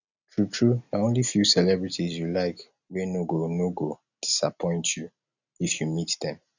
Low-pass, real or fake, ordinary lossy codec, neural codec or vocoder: 7.2 kHz; real; none; none